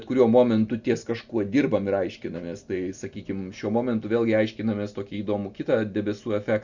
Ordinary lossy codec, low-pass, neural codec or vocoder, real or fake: Opus, 64 kbps; 7.2 kHz; none; real